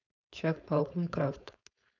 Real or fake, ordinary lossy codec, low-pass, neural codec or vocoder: fake; none; 7.2 kHz; codec, 16 kHz, 4.8 kbps, FACodec